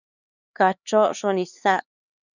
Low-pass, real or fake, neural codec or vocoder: 7.2 kHz; fake; codec, 16 kHz, 4 kbps, X-Codec, HuBERT features, trained on LibriSpeech